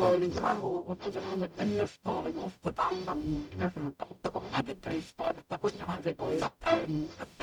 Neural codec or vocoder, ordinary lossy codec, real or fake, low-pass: codec, 44.1 kHz, 0.9 kbps, DAC; none; fake; 19.8 kHz